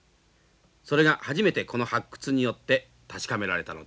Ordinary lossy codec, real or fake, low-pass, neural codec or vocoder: none; real; none; none